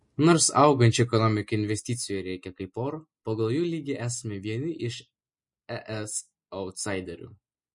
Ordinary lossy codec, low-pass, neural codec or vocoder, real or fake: MP3, 48 kbps; 10.8 kHz; none; real